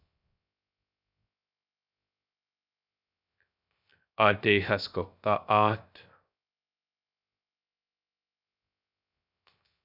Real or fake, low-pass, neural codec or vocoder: fake; 5.4 kHz; codec, 16 kHz, 0.3 kbps, FocalCodec